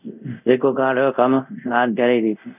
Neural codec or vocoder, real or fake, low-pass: codec, 24 kHz, 0.5 kbps, DualCodec; fake; 3.6 kHz